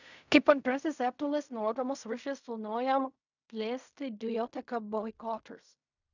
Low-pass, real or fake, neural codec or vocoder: 7.2 kHz; fake; codec, 16 kHz in and 24 kHz out, 0.4 kbps, LongCat-Audio-Codec, fine tuned four codebook decoder